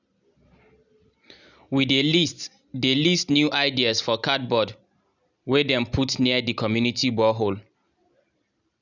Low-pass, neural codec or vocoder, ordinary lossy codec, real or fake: 7.2 kHz; none; none; real